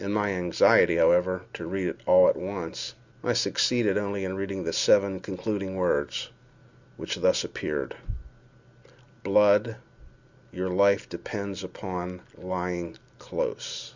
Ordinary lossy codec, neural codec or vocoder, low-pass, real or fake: Opus, 64 kbps; none; 7.2 kHz; real